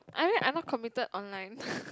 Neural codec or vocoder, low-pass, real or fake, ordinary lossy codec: none; none; real; none